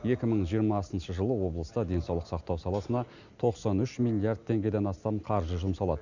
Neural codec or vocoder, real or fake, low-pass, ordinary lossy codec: none; real; 7.2 kHz; none